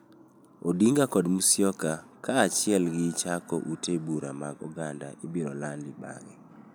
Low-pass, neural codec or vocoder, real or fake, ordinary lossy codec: none; none; real; none